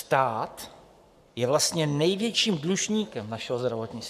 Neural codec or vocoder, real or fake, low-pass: codec, 44.1 kHz, 7.8 kbps, Pupu-Codec; fake; 14.4 kHz